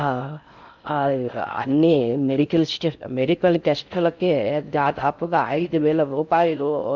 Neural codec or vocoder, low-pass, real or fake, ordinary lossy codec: codec, 16 kHz in and 24 kHz out, 0.6 kbps, FocalCodec, streaming, 4096 codes; 7.2 kHz; fake; none